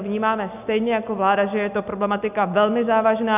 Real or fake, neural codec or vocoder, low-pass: real; none; 3.6 kHz